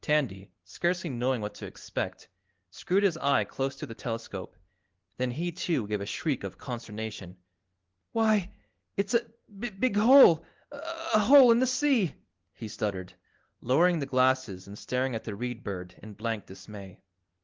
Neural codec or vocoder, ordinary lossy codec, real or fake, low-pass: none; Opus, 16 kbps; real; 7.2 kHz